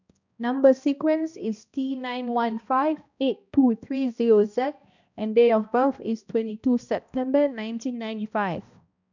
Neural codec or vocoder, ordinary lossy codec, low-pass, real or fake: codec, 16 kHz, 1 kbps, X-Codec, HuBERT features, trained on balanced general audio; none; 7.2 kHz; fake